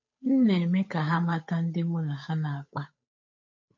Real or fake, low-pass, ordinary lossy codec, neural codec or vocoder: fake; 7.2 kHz; MP3, 32 kbps; codec, 16 kHz, 8 kbps, FunCodec, trained on Chinese and English, 25 frames a second